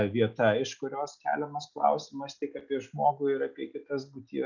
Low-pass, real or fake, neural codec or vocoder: 7.2 kHz; real; none